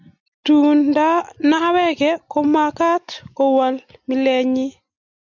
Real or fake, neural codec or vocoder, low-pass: real; none; 7.2 kHz